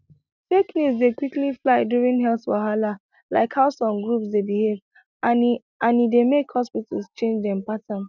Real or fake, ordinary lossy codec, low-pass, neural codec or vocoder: real; none; 7.2 kHz; none